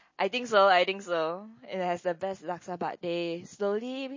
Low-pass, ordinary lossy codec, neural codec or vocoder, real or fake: 7.2 kHz; MP3, 32 kbps; none; real